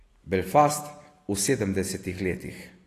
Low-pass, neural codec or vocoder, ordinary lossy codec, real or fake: 14.4 kHz; none; AAC, 48 kbps; real